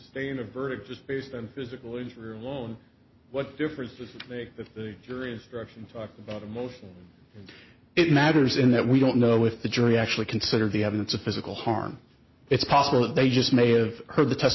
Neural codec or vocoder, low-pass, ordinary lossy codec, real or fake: none; 7.2 kHz; MP3, 24 kbps; real